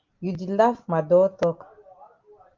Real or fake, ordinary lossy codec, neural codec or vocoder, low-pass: real; Opus, 32 kbps; none; 7.2 kHz